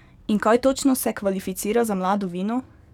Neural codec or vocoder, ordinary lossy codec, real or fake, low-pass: codec, 44.1 kHz, 7.8 kbps, DAC; none; fake; 19.8 kHz